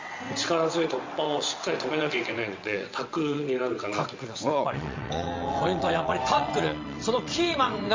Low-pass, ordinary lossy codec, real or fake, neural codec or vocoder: 7.2 kHz; MP3, 48 kbps; fake; vocoder, 22.05 kHz, 80 mel bands, WaveNeXt